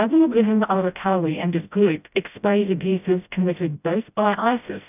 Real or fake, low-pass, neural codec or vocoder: fake; 3.6 kHz; codec, 16 kHz, 0.5 kbps, FreqCodec, smaller model